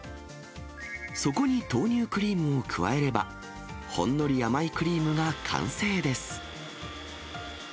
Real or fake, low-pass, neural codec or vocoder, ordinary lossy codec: real; none; none; none